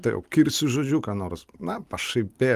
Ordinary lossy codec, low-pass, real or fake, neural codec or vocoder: Opus, 32 kbps; 14.4 kHz; real; none